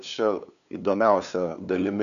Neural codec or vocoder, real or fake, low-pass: codec, 16 kHz, 2 kbps, FunCodec, trained on LibriTTS, 25 frames a second; fake; 7.2 kHz